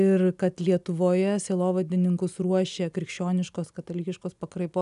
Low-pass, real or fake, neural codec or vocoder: 10.8 kHz; real; none